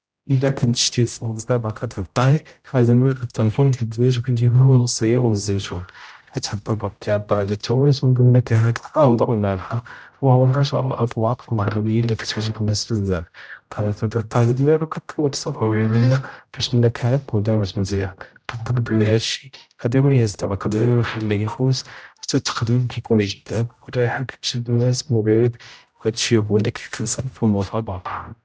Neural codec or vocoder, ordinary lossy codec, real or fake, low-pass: codec, 16 kHz, 0.5 kbps, X-Codec, HuBERT features, trained on general audio; none; fake; none